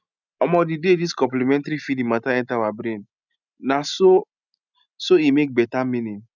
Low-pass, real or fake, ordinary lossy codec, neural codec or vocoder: 7.2 kHz; real; none; none